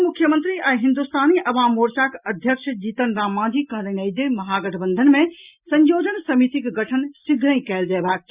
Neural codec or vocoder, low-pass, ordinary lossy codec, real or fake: none; 3.6 kHz; Opus, 64 kbps; real